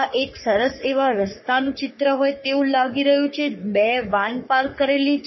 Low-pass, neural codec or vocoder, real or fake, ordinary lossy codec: 7.2 kHz; codec, 44.1 kHz, 3.4 kbps, Pupu-Codec; fake; MP3, 24 kbps